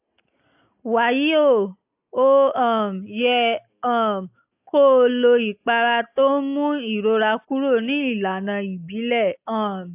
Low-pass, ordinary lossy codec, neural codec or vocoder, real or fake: 3.6 kHz; AAC, 32 kbps; none; real